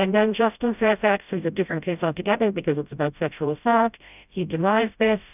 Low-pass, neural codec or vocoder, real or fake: 3.6 kHz; codec, 16 kHz, 0.5 kbps, FreqCodec, smaller model; fake